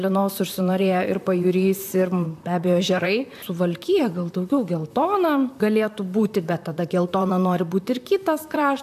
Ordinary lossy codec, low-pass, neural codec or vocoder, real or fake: AAC, 96 kbps; 14.4 kHz; vocoder, 44.1 kHz, 128 mel bands, Pupu-Vocoder; fake